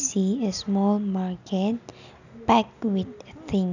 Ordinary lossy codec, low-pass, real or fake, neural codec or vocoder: none; 7.2 kHz; real; none